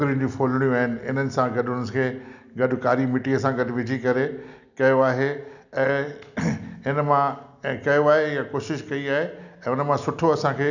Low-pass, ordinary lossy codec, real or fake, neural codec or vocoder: 7.2 kHz; none; real; none